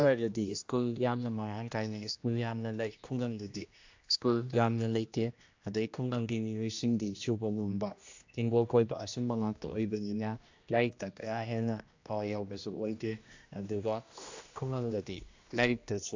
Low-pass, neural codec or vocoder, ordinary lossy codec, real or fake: 7.2 kHz; codec, 16 kHz, 1 kbps, X-Codec, HuBERT features, trained on general audio; AAC, 48 kbps; fake